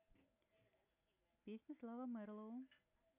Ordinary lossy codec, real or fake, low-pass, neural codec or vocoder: none; real; 3.6 kHz; none